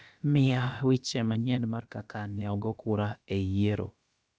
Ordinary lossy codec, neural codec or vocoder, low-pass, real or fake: none; codec, 16 kHz, about 1 kbps, DyCAST, with the encoder's durations; none; fake